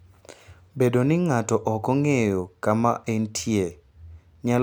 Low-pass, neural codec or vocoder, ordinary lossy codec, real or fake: none; none; none; real